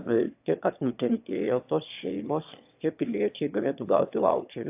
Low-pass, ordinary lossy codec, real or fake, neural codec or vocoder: 3.6 kHz; AAC, 32 kbps; fake; autoencoder, 22.05 kHz, a latent of 192 numbers a frame, VITS, trained on one speaker